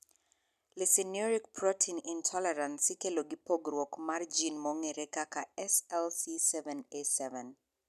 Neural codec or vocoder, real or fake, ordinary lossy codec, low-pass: none; real; none; 14.4 kHz